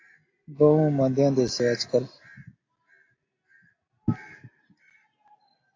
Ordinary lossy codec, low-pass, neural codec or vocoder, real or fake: AAC, 32 kbps; 7.2 kHz; none; real